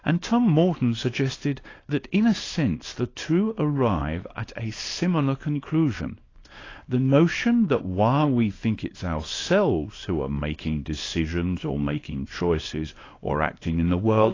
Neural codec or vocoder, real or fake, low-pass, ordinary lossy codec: codec, 24 kHz, 0.9 kbps, WavTokenizer, small release; fake; 7.2 kHz; AAC, 32 kbps